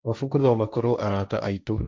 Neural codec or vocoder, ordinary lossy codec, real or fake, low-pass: codec, 16 kHz, 1.1 kbps, Voila-Tokenizer; none; fake; none